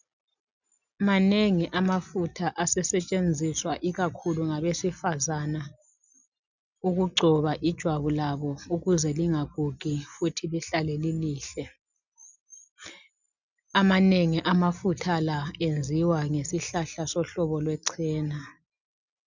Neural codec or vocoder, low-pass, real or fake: none; 7.2 kHz; real